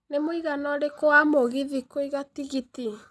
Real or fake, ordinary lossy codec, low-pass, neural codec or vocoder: real; none; none; none